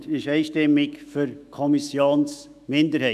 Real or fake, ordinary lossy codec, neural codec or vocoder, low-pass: fake; none; autoencoder, 48 kHz, 128 numbers a frame, DAC-VAE, trained on Japanese speech; 14.4 kHz